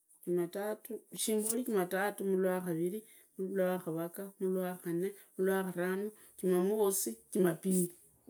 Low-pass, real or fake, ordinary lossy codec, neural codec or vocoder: none; real; none; none